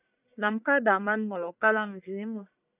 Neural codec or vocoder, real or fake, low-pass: codec, 44.1 kHz, 1.7 kbps, Pupu-Codec; fake; 3.6 kHz